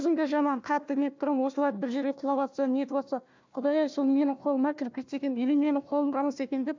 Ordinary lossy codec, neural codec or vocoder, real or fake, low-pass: MP3, 64 kbps; codec, 16 kHz, 1 kbps, FunCodec, trained on Chinese and English, 50 frames a second; fake; 7.2 kHz